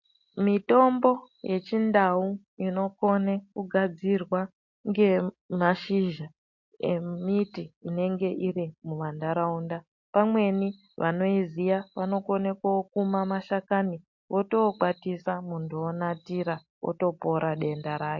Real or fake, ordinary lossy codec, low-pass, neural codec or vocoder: real; MP3, 48 kbps; 7.2 kHz; none